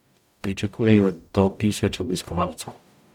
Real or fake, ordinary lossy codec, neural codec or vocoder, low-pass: fake; none; codec, 44.1 kHz, 0.9 kbps, DAC; 19.8 kHz